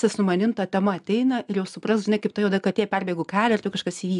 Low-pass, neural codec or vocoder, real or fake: 10.8 kHz; none; real